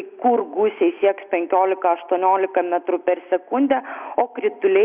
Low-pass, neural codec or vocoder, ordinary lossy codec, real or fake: 3.6 kHz; none; Opus, 64 kbps; real